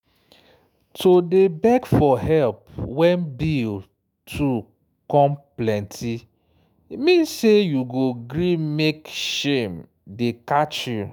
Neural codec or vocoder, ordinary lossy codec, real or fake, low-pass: autoencoder, 48 kHz, 128 numbers a frame, DAC-VAE, trained on Japanese speech; none; fake; none